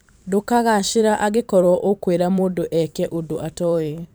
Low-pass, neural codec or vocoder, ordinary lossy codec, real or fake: none; vocoder, 44.1 kHz, 128 mel bands every 512 samples, BigVGAN v2; none; fake